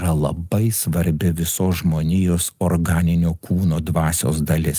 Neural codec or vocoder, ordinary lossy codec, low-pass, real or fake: none; Opus, 32 kbps; 14.4 kHz; real